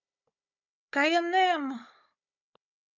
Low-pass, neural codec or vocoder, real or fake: 7.2 kHz; codec, 16 kHz, 16 kbps, FunCodec, trained on Chinese and English, 50 frames a second; fake